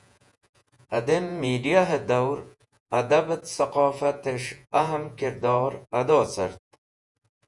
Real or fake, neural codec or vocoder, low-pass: fake; vocoder, 48 kHz, 128 mel bands, Vocos; 10.8 kHz